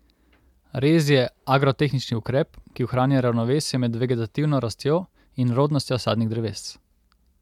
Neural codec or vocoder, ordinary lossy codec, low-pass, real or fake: none; MP3, 96 kbps; 19.8 kHz; real